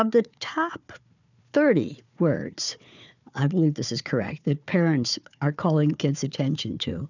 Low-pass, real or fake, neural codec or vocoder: 7.2 kHz; fake; codec, 16 kHz, 4 kbps, FunCodec, trained on Chinese and English, 50 frames a second